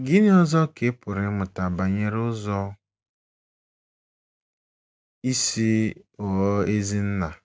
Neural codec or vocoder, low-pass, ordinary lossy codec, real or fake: none; none; none; real